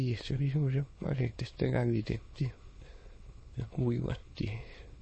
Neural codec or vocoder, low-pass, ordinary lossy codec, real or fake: autoencoder, 22.05 kHz, a latent of 192 numbers a frame, VITS, trained on many speakers; 9.9 kHz; MP3, 32 kbps; fake